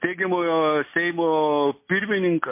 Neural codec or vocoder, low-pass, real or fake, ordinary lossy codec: none; 3.6 kHz; real; MP3, 24 kbps